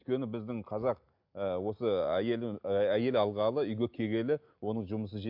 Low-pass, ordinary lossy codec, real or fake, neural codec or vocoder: 5.4 kHz; MP3, 48 kbps; real; none